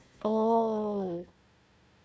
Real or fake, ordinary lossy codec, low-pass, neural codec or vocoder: fake; none; none; codec, 16 kHz, 1 kbps, FunCodec, trained on Chinese and English, 50 frames a second